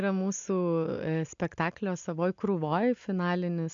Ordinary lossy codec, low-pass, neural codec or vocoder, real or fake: AAC, 64 kbps; 7.2 kHz; none; real